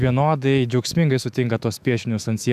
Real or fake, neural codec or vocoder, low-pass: fake; autoencoder, 48 kHz, 128 numbers a frame, DAC-VAE, trained on Japanese speech; 14.4 kHz